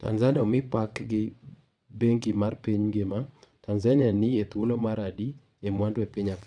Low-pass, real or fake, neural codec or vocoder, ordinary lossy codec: 9.9 kHz; fake; vocoder, 22.05 kHz, 80 mel bands, WaveNeXt; none